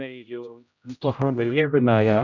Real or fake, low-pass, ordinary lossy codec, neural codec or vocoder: fake; 7.2 kHz; none; codec, 16 kHz, 0.5 kbps, X-Codec, HuBERT features, trained on general audio